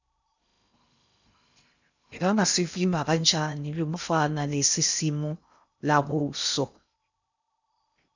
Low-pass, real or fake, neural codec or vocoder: 7.2 kHz; fake; codec, 16 kHz in and 24 kHz out, 0.6 kbps, FocalCodec, streaming, 2048 codes